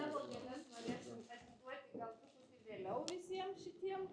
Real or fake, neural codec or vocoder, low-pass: real; none; 9.9 kHz